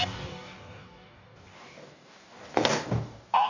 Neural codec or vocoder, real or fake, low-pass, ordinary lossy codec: codec, 44.1 kHz, 2.6 kbps, DAC; fake; 7.2 kHz; none